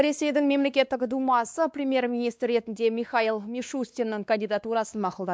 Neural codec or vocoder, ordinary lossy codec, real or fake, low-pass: codec, 16 kHz, 2 kbps, X-Codec, WavLM features, trained on Multilingual LibriSpeech; none; fake; none